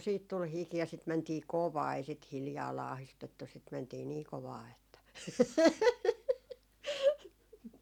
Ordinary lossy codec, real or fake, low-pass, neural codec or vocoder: none; real; 19.8 kHz; none